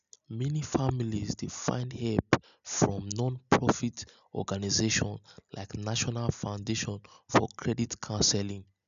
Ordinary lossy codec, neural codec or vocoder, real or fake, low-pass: none; none; real; 7.2 kHz